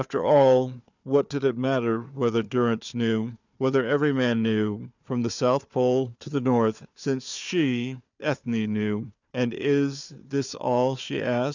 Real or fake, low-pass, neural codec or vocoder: fake; 7.2 kHz; codec, 16 kHz, 4 kbps, FunCodec, trained on Chinese and English, 50 frames a second